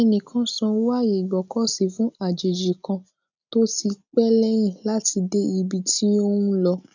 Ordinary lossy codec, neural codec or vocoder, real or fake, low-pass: none; none; real; 7.2 kHz